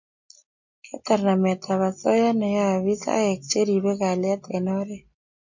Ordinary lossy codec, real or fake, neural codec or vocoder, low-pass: MP3, 48 kbps; real; none; 7.2 kHz